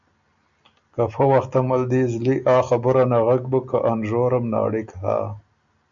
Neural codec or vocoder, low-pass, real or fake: none; 7.2 kHz; real